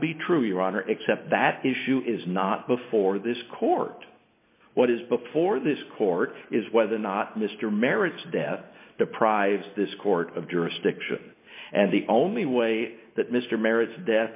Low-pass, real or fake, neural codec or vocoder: 3.6 kHz; real; none